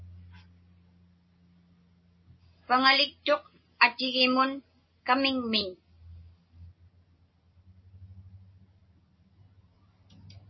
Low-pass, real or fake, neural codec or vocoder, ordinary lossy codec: 7.2 kHz; real; none; MP3, 24 kbps